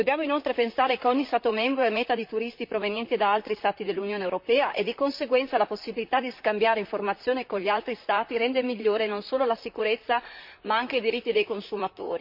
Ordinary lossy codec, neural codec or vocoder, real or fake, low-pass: MP3, 48 kbps; vocoder, 44.1 kHz, 128 mel bands, Pupu-Vocoder; fake; 5.4 kHz